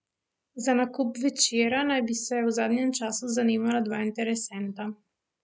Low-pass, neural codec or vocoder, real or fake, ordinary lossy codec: none; none; real; none